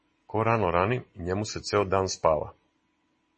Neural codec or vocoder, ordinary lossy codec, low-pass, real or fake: none; MP3, 32 kbps; 10.8 kHz; real